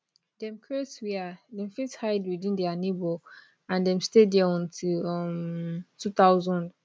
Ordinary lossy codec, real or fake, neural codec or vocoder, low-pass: none; real; none; none